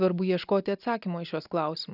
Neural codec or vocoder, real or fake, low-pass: none; real; 5.4 kHz